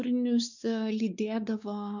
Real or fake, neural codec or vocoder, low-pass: fake; codec, 16 kHz, 4 kbps, X-Codec, WavLM features, trained on Multilingual LibriSpeech; 7.2 kHz